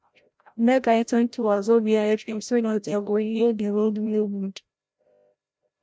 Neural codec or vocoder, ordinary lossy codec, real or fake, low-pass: codec, 16 kHz, 0.5 kbps, FreqCodec, larger model; none; fake; none